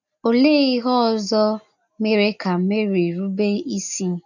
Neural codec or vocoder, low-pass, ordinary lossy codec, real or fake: none; 7.2 kHz; none; real